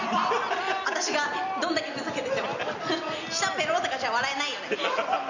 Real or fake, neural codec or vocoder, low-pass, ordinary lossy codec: real; none; 7.2 kHz; none